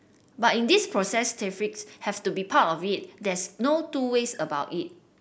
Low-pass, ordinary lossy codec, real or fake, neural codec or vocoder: none; none; real; none